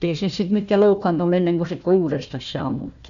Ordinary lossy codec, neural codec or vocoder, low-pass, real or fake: none; codec, 16 kHz, 1 kbps, FunCodec, trained on Chinese and English, 50 frames a second; 7.2 kHz; fake